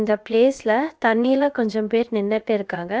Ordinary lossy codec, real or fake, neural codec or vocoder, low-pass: none; fake; codec, 16 kHz, about 1 kbps, DyCAST, with the encoder's durations; none